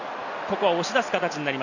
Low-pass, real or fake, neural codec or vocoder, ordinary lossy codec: 7.2 kHz; real; none; none